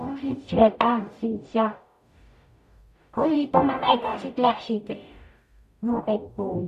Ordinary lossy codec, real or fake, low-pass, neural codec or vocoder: none; fake; 14.4 kHz; codec, 44.1 kHz, 0.9 kbps, DAC